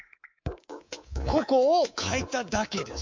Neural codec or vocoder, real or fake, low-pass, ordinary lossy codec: codec, 24 kHz, 3.1 kbps, DualCodec; fake; 7.2 kHz; MP3, 48 kbps